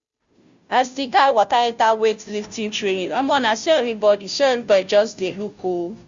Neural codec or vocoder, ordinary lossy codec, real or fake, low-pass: codec, 16 kHz, 0.5 kbps, FunCodec, trained on Chinese and English, 25 frames a second; Opus, 64 kbps; fake; 7.2 kHz